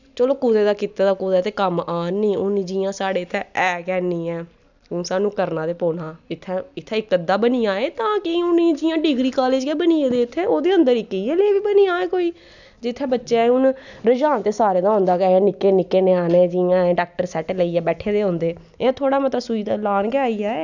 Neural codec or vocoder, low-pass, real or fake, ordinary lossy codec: none; 7.2 kHz; real; none